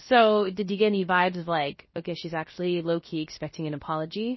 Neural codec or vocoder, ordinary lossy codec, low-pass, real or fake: codec, 16 kHz, 0.3 kbps, FocalCodec; MP3, 24 kbps; 7.2 kHz; fake